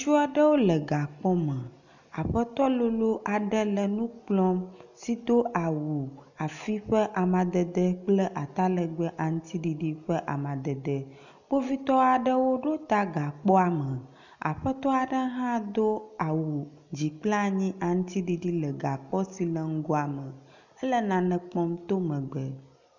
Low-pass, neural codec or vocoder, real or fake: 7.2 kHz; none; real